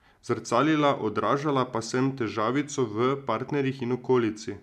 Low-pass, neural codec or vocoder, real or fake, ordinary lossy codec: 14.4 kHz; none; real; none